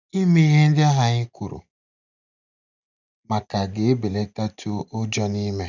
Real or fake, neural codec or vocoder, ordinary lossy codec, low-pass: real; none; none; 7.2 kHz